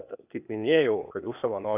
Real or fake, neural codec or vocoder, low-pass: fake; codec, 16 kHz, 0.8 kbps, ZipCodec; 3.6 kHz